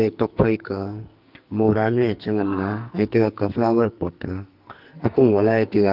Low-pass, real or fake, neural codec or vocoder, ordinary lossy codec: 5.4 kHz; fake; codec, 44.1 kHz, 2.6 kbps, SNAC; Opus, 32 kbps